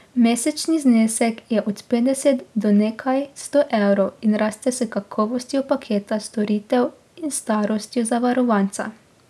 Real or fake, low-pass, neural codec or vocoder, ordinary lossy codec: real; none; none; none